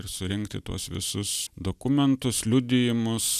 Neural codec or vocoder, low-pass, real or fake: none; 14.4 kHz; real